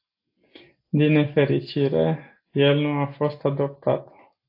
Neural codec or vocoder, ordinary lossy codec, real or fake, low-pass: none; AAC, 32 kbps; real; 5.4 kHz